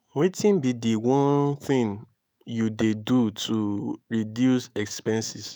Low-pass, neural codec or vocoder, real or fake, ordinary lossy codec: none; autoencoder, 48 kHz, 128 numbers a frame, DAC-VAE, trained on Japanese speech; fake; none